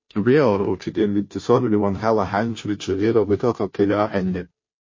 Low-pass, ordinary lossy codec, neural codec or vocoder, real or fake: 7.2 kHz; MP3, 32 kbps; codec, 16 kHz, 0.5 kbps, FunCodec, trained on Chinese and English, 25 frames a second; fake